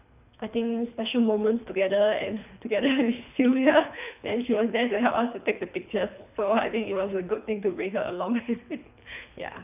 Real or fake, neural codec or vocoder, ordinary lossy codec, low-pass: fake; codec, 24 kHz, 3 kbps, HILCodec; none; 3.6 kHz